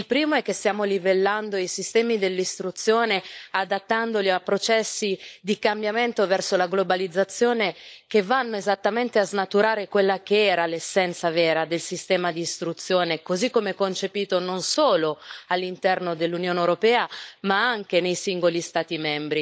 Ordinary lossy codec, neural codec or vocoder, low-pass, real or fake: none; codec, 16 kHz, 16 kbps, FunCodec, trained on LibriTTS, 50 frames a second; none; fake